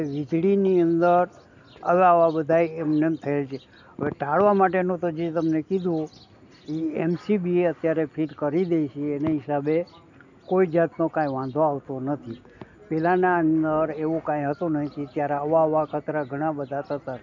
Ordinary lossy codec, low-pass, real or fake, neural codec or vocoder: none; 7.2 kHz; real; none